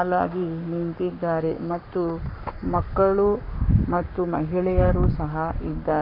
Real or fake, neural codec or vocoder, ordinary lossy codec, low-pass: fake; codec, 44.1 kHz, 7.8 kbps, Pupu-Codec; none; 5.4 kHz